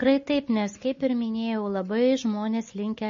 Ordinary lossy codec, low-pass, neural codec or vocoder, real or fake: MP3, 32 kbps; 7.2 kHz; none; real